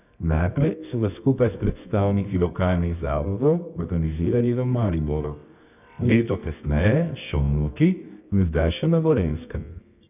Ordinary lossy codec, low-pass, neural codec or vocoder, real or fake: none; 3.6 kHz; codec, 24 kHz, 0.9 kbps, WavTokenizer, medium music audio release; fake